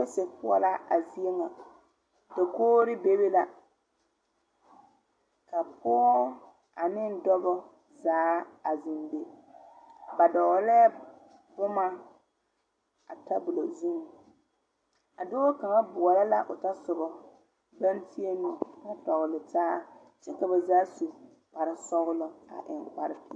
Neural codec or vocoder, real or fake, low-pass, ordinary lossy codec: none; real; 9.9 kHz; AAC, 48 kbps